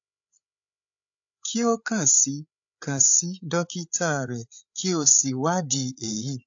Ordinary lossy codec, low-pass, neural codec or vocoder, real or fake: AAC, 64 kbps; 7.2 kHz; codec, 16 kHz, 8 kbps, FreqCodec, larger model; fake